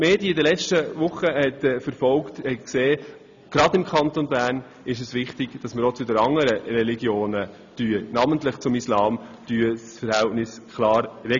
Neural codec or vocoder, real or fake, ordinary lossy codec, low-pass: none; real; none; 7.2 kHz